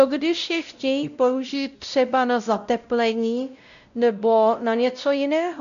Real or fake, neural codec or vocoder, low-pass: fake; codec, 16 kHz, 0.5 kbps, X-Codec, WavLM features, trained on Multilingual LibriSpeech; 7.2 kHz